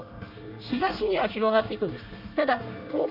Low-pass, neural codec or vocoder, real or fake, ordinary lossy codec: 5.4 kHz; codec, 24 kHz, 1 kbps, SNAC; fake; none